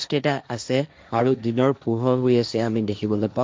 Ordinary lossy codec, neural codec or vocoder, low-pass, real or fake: none; codec, 16 kHz, 1.1 kbps, Voila-Tokenizer; none; fake